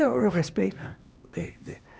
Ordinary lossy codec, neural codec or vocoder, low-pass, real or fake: none; codec, 16 kHz, 2 kbps, X-Codec, HuBERT features, trained on LibriSpeech; none; fake